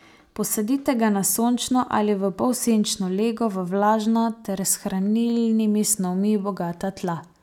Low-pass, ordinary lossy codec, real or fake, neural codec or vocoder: 19.8 kHz; none; real; none